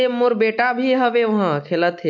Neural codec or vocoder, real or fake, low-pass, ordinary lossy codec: none; real; 7.2 kHz; MP3, 48 kbps